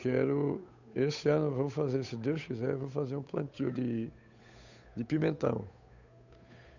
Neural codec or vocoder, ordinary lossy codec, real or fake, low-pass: codec, 16 kHz, 8 kbps, FunCodec, trained on Chinese and English, 25 frames a second; none; fake; 7.2 kHz